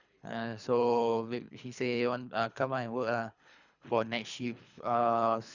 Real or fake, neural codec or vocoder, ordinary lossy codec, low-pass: fake; codec, 24 kHz, 3 kbps, HILCodec; none; 7.2 kHz